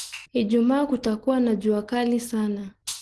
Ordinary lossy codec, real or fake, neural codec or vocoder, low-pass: Opus, 16 kbps; real; none; 10.8 kHz